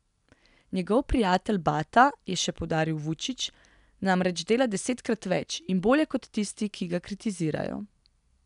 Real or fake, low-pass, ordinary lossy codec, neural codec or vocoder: real; 10.8 kHz; none; none